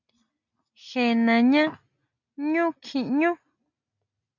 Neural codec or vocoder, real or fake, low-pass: none; real; 7.2 kHz